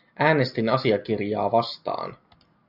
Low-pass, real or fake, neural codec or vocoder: 5.4 kHz; real; none